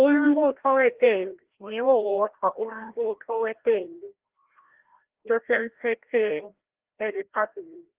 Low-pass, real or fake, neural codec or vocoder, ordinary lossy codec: 3.6 kHz; fake; codec, 16 kHz, 1 kbps, FreqCodec, larger model; Opus, 16 kbps